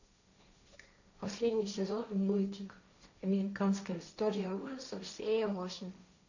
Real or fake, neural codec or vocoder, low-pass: fake; codec, 16 kHz, 1.1 kbps, Voila-Tokenizer; 7.2 kHz